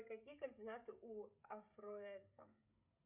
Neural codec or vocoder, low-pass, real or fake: codec, 16 kHz, 16 kbps, FreqCodec, smaller model; 3.6 kHz; fake